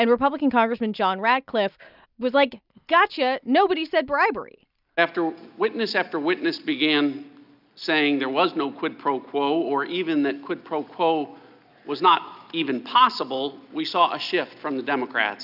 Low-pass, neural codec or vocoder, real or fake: 5.4 kHz; none; real